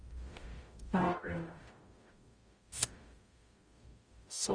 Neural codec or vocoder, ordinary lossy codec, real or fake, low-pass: codec, 44.1 kHz, 0.9 kbps, DAC; Opus, 32 kbps; fake; 9.9 kHz